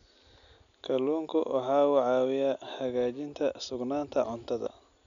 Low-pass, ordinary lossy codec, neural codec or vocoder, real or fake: 7.2 kHz; none; none; real